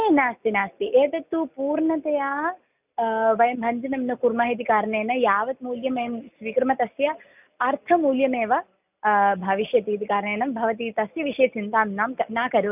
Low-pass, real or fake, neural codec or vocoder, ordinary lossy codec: 3.6 kHz; real; none; none